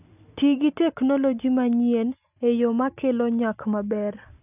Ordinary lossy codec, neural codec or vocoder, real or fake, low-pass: none; none; real; 3.6 kHz